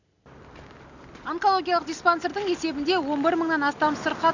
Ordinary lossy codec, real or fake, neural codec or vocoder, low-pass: none; real; none; 7.2 kHz